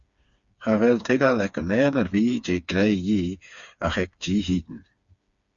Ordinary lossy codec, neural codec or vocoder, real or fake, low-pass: Opus, 64 kbps; codec, 16 kHz, 4 kbps, FreqCodec, smaller model; fake; 7.2 kHz